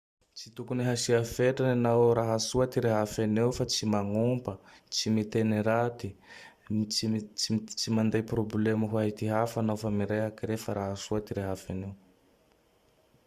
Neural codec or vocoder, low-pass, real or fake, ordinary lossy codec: vocoder, 44.1 kHz, 128 mel bands every 512 samples, BigVGAN v2; 14.4 kHz; fake; Opus, 64 kbps